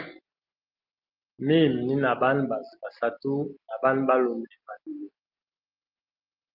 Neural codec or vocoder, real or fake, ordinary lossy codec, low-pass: none; real; Opus, 32 kbps; 5.4 kHz